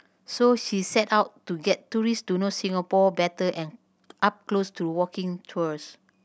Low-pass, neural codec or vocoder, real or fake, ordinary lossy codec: none; none; real; none